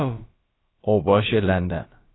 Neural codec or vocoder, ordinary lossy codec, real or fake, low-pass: codec, 16 kHz, about 1 kbps, DyCAST, with the encoder's durations; AAC, 16 kbps; fake; 7.2 kHz